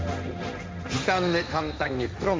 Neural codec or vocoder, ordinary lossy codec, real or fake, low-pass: codec, 16 kHz, 1.1 kbps, Voila-Tokenizer; none; fake; none